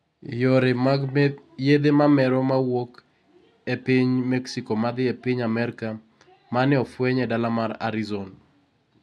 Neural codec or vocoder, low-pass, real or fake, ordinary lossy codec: none; none; real; none